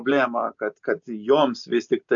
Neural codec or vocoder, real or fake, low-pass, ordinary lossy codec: none; real; 7.2 kHz; AAC, 64 kbps